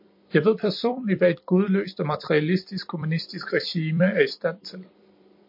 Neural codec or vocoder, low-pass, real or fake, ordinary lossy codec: vocoder, 44.1 kHz, 128 mel bands every 256 samples, BigVGAN v2; 5.4 kHz; fake; MP3, 32 kbps